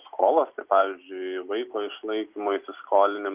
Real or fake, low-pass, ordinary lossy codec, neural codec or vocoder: real; 3.6 kHz; Opus, 32 kbps; none